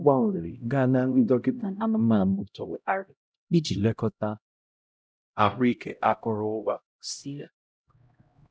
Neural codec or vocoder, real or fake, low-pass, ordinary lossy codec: codec, 16 kHz, 0.5 kbps, X-Codec, HuBERT features, trained on LibriSpeech; fake; none; none